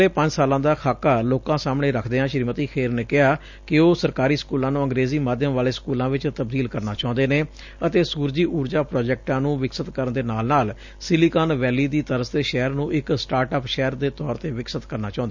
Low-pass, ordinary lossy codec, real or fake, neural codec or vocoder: 7.2 kHz; none; real; none